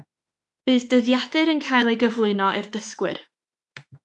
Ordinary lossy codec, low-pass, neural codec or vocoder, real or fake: AAC, 64 kbps; 10.8 kHz; autoencoder, 48 kHz, 32 numbers a frame, DAC-VAE, trained on Japanese speech; fake